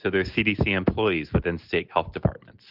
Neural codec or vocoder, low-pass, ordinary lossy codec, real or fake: none; 5.4 kHz; Opus, 32 kbps; real